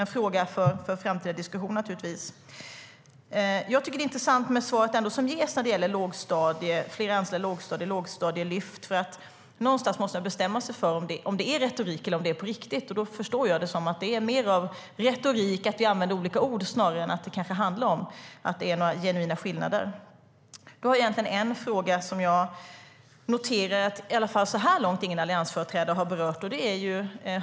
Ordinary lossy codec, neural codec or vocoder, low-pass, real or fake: none; none; none; real